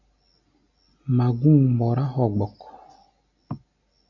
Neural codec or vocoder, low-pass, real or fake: none; 7.2 kHz; real